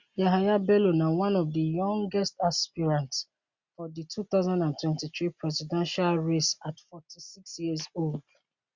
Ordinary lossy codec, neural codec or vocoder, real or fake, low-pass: Opus, 64 kbps; none; real; 7.2 kHz